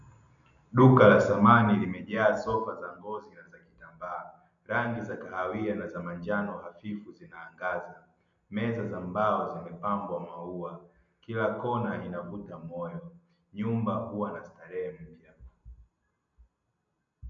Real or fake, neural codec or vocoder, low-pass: real; none; 7.2 kHz